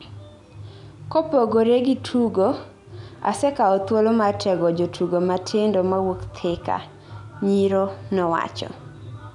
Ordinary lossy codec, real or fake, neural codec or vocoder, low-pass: none; real; none; 10.8 kHz